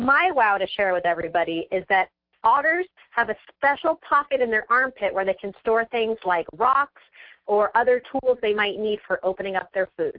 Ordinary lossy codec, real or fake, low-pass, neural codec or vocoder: MP3, 48 kbps; real; 5.4 kHz; none